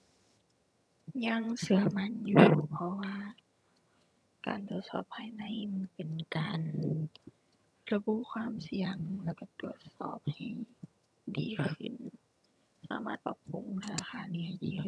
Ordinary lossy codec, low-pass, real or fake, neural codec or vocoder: none; none; fake; vocoder, 22.05 kHz, 80 mel bands, HiFi-GAN